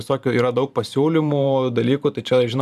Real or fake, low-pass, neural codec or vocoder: fake; 14.4 kHz; vocoder, 44.1 kHz, 128 mel bands every 512 samples, BigVGAN v2